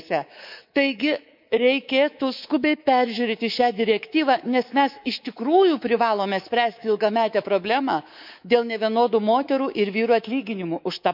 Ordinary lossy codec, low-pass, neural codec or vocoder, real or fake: none; 5.4 kHz; codec, 24 kHz, 3.1 kbps, DualCodec; fake